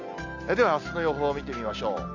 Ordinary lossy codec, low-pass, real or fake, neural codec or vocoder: none; 7.2 kHz; real; none